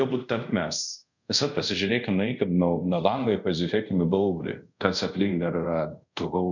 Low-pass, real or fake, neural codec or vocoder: 7.2 kHz; fake; codec, 24 kHz, 0.5 kbps, DualCodec